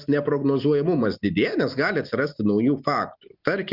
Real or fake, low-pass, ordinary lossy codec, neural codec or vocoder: real; 5.4 kHz; Opus, 64 kbps; none